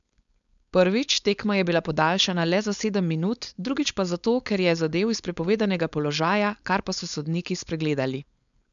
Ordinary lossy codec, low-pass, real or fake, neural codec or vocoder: none; 7.2 kHz; fake; codec, 16 kHz, 4.8 kbps, FACodec